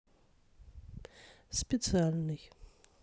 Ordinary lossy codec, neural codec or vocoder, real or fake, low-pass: none; none; real; none